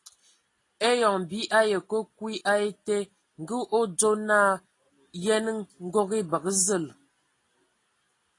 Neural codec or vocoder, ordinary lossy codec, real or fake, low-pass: none; AAC, 32 kbps; real; 10.8 kHz